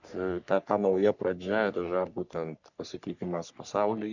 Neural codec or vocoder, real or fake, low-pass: codec, 44.1 kHz, 3.4 kbps, Pupu-Codec; fake; 7.2 kHz